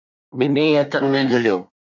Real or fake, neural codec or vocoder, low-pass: fake; codec, 24 kHz, 1 kbps, SNAC; 7.2 kHz